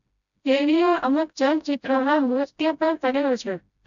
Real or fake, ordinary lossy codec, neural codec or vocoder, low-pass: fake; none; codec, 16 kHz, 0.5 kbps, FreqCodec, smaller model; 7.2 kHz